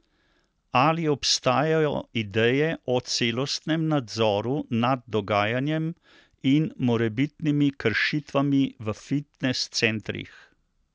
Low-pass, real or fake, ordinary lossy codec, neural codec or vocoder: none; real; none; none